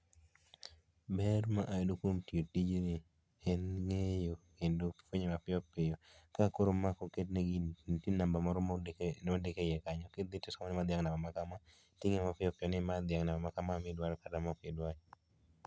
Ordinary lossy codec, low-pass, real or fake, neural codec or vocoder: none; none; real; none